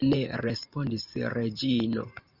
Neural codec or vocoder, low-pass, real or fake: vocoder, 44.1 kHz, 128 mel bands every 512 samples, BigVGAN v2; 5.4 kHz; fake